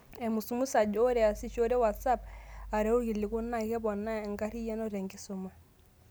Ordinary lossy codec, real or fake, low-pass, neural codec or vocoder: none; real; none; none